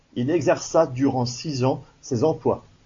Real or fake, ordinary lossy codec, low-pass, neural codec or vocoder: real; AAC, 48 kbps; 7.2 kHz; none